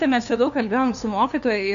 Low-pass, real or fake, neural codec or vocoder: 7.2 kHz; fake; codec, 16 kHz, 2 kbps, FunCodec, trained on LibriTTS, 25 frames a second